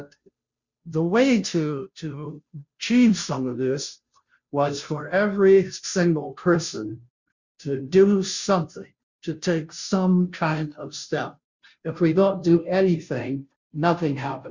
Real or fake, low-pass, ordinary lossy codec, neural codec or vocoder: fake; 7.2 kHz; Opus, 64 kbps; codec, 16 kHz, 0.5 kbps, FunCodec, trained on Chinese and English, 25 frames a second